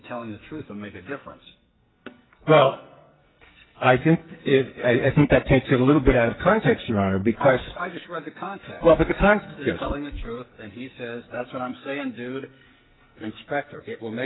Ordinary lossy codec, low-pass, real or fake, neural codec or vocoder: AAC, 16 kbps; 7.2 kHz; fake; codec, 44.1 kHz, 2.6 kbps, SNAC